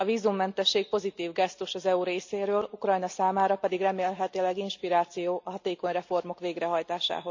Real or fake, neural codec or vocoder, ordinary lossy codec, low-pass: real; none; MP3, 64 kbps; 7.2 kHz